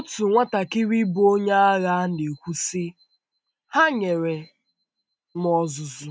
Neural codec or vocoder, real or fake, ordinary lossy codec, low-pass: none; real; none; none